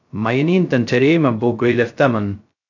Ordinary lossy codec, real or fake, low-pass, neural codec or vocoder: AAC, 48 kbps; fake; 7.2 kHz; codec, 16 kHz, 0.2 kbps, FocalCodec